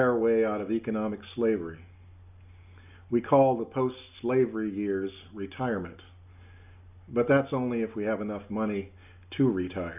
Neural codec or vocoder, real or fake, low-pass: none; real; 3.6 kHz